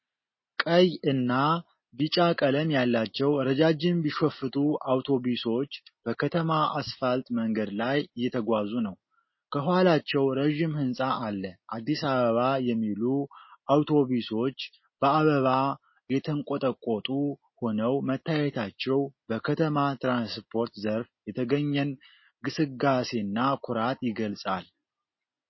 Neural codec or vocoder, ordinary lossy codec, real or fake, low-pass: none; MP3, 24 kbps; real; 7.2 kHz